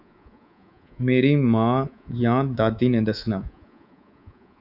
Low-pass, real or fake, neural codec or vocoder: 5.4 kHz; fake; codec, 24 kHz, 3.1 kbps, DualCodec